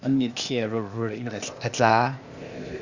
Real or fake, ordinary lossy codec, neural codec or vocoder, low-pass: fake; Opus, 64 kbps; codec, 16 kHz, 0.8 kbps, ZipCodec; 7.2 kHz